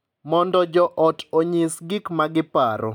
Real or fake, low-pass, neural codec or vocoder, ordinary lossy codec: real; 19.8 kHz; none; none